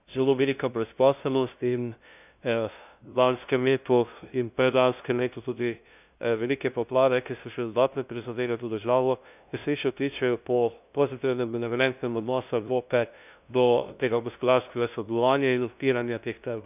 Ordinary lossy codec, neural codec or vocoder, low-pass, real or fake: none; codec, 16 kHz, 0.5 kbps, FunCodec, trained on LibriTTS, 25 frames a second; 3.6 kHz; fake